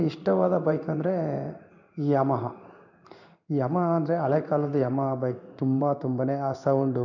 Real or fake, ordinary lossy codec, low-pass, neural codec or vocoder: fake; none; 7.2 kHz; codec, 16 kHz in and 24 kHz out, 1 kbps, XY-Tokenizer